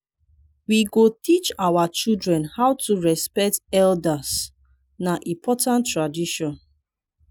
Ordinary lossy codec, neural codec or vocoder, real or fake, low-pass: none; none; real; none